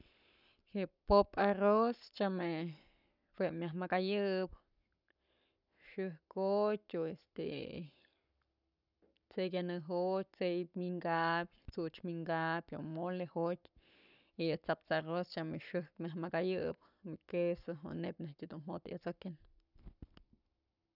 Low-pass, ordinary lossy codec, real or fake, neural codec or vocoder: 5.4 kHz; none; fake; codec, 44.1 kHz, 7.8 kbps, Pupu-Codec